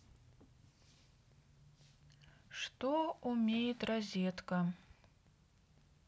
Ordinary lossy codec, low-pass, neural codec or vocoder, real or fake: none; none; none; real